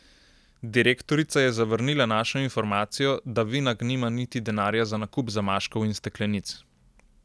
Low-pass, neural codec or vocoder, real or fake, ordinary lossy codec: none; none; real; none